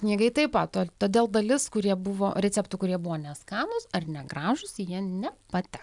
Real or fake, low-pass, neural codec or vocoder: real; 10.8 kHz; none